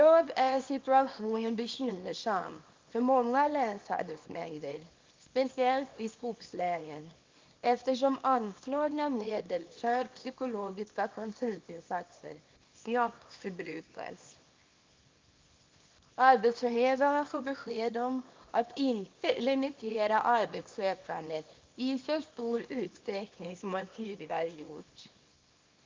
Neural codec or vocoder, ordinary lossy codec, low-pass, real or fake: codec, 24 kHz, 0.9 kbps, WavTokenizer, small release; Opus, 24 kbps; 7.2 kHz; fake